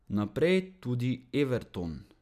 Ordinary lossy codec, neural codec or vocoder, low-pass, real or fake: none; none; 14.4 kHz; real